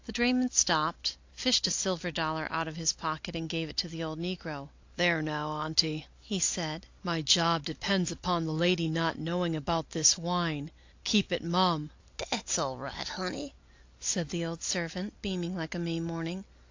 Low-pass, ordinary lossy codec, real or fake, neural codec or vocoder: 7.2 kHz; AAC, 48 kbps; real; none